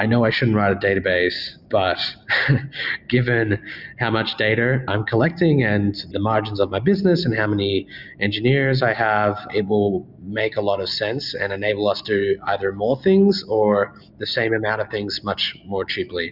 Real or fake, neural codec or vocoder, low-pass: real; none; 5.4 kHz